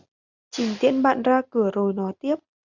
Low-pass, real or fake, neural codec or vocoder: 7.2 kHz; real; none